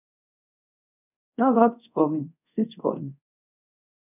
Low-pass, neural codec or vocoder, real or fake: 3.6 kHz; codec, 24 kHz, 0.5 kbps, DualCodec; fake